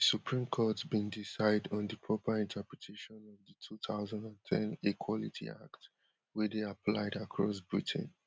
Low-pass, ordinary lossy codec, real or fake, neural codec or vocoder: none; none; real; none